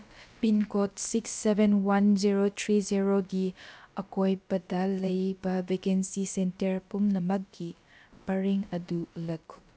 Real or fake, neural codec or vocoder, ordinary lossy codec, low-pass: fake; codec, 16 kHz, about 1 kbps, DyCAST, with the encoder's durations; none; none